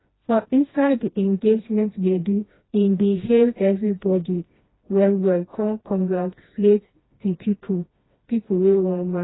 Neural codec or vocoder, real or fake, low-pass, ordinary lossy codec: codec, 16 kHz, 1 kbps, FreqCodec, smaller model; fake; 7.2 kHz; AAC, 16 kbps